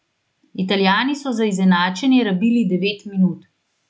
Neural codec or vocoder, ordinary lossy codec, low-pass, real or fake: none; none; none; real